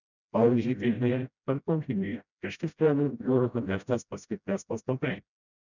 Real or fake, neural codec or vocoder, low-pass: fake; codec, 16 kHz, 0.5 kbps, FreqCodec, smaller model; 7.2 kHz